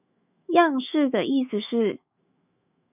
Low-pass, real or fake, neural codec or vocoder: 3.6 kHz; fake; autoencoder, 48 kHz, 128 numbers a frame, DAC-VAE, trained on Japanese speech